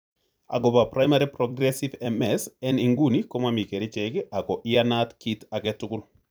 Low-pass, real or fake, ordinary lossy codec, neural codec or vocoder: none; fake; none; vocoder, 44.1 kHz, 128 mel bands every 256 samples, BigVGAN v2